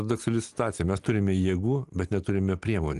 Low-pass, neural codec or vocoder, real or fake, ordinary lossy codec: 10.8 kHz; none; real; Opus, 24 kbps